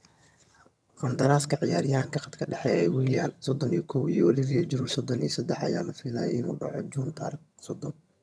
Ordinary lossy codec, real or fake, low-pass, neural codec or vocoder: none; fake; none; vocoder, 22.05 kHz, 80 mel bands, HiFi-GAN